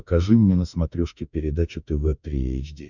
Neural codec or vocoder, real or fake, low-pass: autoencoder, 48 kHz, 32 numbers a frame, DAC-VAE, trained on Japanese speech; fake; 7.2 kHz